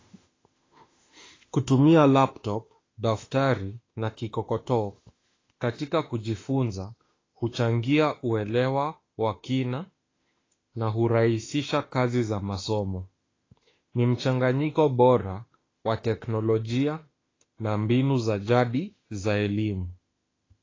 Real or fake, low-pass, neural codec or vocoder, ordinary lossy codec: fake; 7.2 kHz; autoencoder, 48 kHz, 32 numbers a frame, DAC-VAE, trained on Japanese speech; AAC, 32 kbps